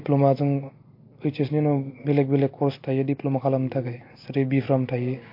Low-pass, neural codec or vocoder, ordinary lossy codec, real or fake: 5.4 kHz; none; MP3, 32 kbps; real